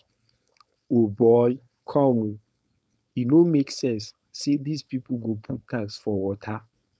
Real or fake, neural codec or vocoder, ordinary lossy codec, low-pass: fake; codec, 16 kHz, 4.8 kbps, FACodec; none; none